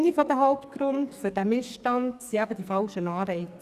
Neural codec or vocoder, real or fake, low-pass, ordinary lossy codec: codec, 44.1 kHz, 2.6 kbps, SNAC; fake; 14.4 kHz; Opus, 64 kbps